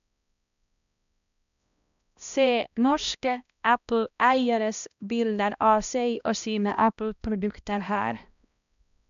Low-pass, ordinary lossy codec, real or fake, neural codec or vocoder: 7.2 kHz; none; fake; codec, 16 kHz, 1 kbps, X-Codec, HuBERT features, trained on balanced general audio